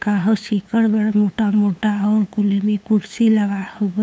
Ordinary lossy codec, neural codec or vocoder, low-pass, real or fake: none; codec, 16 kHz, 2 kbps, FunCodec, trained on LibriTTS, 25 frames a second; none; fake